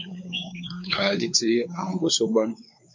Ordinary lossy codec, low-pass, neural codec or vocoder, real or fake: MP3, 48 kbps; 7.2 kHz; codec, 16 kHz, 4 kbps, X-Codec, WavLM features, trained on Multilingual LibriSpeech; fake